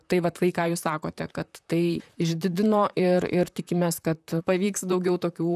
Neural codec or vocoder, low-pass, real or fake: vocoder, 44.1 kHz, 128 mel bands, Pupu-Vocoder; 14.4 kHz; fake